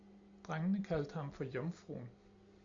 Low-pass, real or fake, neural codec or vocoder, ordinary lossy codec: 7.2 kHz; real; none; Opus, 64 kbps